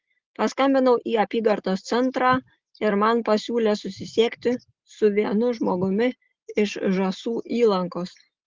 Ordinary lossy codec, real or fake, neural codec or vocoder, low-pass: Opus, 16 kbps; real; none; 7.2 kHz